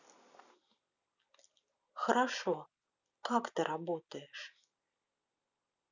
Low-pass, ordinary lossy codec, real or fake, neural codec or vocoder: 7.2 kHz; none; real; none